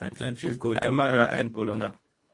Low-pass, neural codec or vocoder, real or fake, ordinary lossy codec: 10.8 kHz; codec, 24 kHz, 1.5 kbps, HILCodec; fake; MP3, 48 kbps